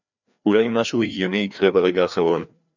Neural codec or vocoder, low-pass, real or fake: codec, 16 kHz, 2 kbps, FreqCodec, larger model; 7.2 kHz; fake